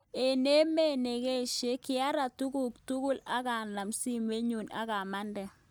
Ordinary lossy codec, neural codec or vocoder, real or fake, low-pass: none; none; real; none